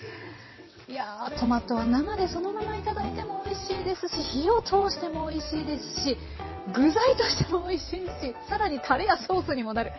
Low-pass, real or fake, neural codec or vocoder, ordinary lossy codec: 7.2 kHz; fake; vocoder, 22.05 kHz, 80 mel bands, WaveNeXt; MP3, 24 kbps